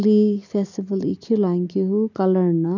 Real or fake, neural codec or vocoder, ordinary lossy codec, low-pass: real; none; none; 7.2 kHz